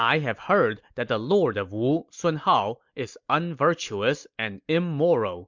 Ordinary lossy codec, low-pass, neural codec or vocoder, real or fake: MP3, 64 kbps; 7.2 kHz; none; real